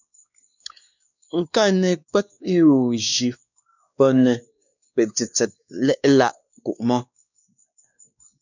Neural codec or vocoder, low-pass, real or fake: codec, 16 kHz, 2 kbps, X-Codec, WavLM features, trained on Multilingual LibriSpeech; 7.2 kHz; fake